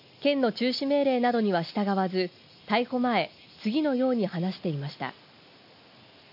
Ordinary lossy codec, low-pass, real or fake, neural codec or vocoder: none; 5.4 kHz; real; none